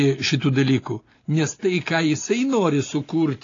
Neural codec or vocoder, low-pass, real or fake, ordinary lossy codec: none; 7.2 kHz; real; AAC, 32 kbps